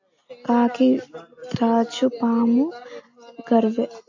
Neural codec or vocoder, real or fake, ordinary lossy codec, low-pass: none; real; AAC, 48 kbps; 7.2 kHz